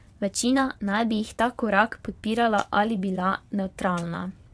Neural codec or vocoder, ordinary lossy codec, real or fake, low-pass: vocoder, 22.05 kHz, 80 mel bands, WaveNeXt; none; fake; none